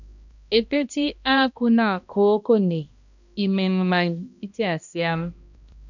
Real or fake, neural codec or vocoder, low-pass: fake; codec, 16 kHz, 1 kbps, X-Codec, HuBERT features, trained on balanced general audio; 7.2 kHz